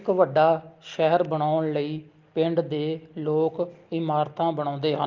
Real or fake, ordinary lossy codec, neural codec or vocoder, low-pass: real; Opus, 32 kbps; none; 7.2 kHz